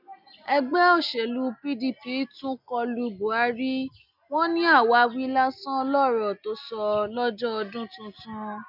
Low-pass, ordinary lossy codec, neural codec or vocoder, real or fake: 5.4 kHz; none; none; real